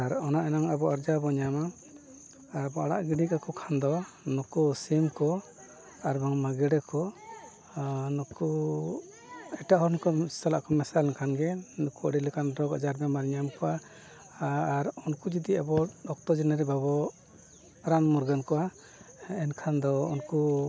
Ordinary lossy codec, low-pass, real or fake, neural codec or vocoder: none; none; real; none